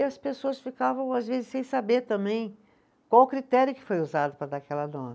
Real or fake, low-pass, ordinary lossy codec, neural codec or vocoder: real; none; none; none